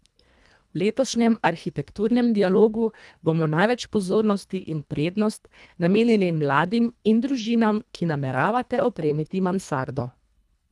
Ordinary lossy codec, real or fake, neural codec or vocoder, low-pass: none; fake; codec, 24 kHz, 1.5 kbps, HILCodec; none